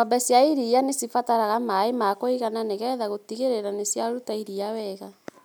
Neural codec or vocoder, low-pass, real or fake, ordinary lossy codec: none; none; real; none